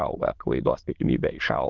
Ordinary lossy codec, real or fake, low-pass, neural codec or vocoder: Opus, 16 kbps; fake; 7.2 kHz; autoencoder, 22.05 kHz, a latent of 192 numbers a frame, VITS, trained on many speakers